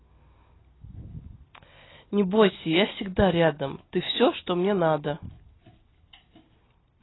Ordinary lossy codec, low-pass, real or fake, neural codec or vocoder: AAC, 16 kbps; 7.2 kHz; real; none